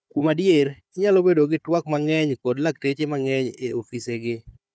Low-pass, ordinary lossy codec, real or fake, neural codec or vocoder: none; none; fake; codec, 16 kHz, 4 kbps, FunCodec, trained on Chinese and English, 50 frames a second